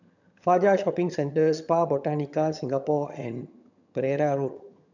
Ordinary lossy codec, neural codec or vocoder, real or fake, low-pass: none; vocoder, 22.05 kHz, 80 mel bands, HiFi-GAN; fake; 7.2 kHz